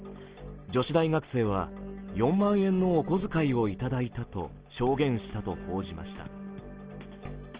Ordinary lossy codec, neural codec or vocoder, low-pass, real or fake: Opus, 16 kbps; none; 3.6 kHz; real